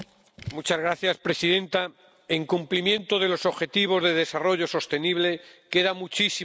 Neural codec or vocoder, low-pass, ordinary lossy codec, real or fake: none; none; none; real